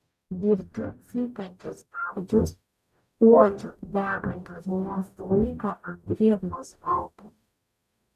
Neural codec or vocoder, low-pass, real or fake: codec, 44.1 kHz, 0.9 kbps, DAC; 14.4 kHz; fake